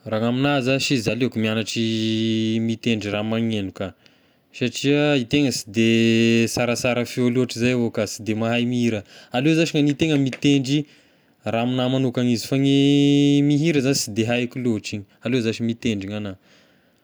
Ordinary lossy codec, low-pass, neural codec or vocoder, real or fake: none; none; none; real